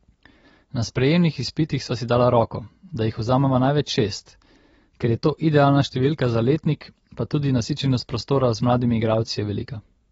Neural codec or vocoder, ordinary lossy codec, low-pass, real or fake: none; AAC, 24 kbps; 19.8 kHz; real